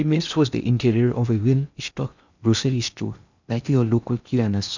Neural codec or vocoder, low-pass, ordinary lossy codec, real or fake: codec, 16 kHz in and 24 kHz out, 0.6 kbps, FocalCodec, streaming, 2048 codes; 7.2 kHz; none; fake